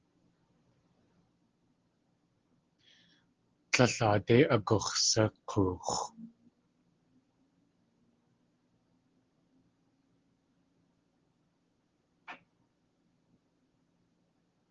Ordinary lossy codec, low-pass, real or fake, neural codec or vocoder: Opus, 16 kbps; 7.2 kHz; real; none